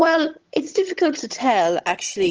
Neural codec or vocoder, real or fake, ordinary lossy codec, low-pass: vocoder, 22.05 kHz, 80 mel bands, HiFi-GAN; fake; Opus, 16 kbps; 7.2 kHz